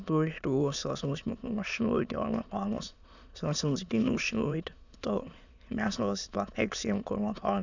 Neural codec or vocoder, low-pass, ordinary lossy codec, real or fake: autoencoder, 22.05 kHz, a latent of 192 numbers a frame, VITS, trained on many speakers; 7.2 kHz; none; fake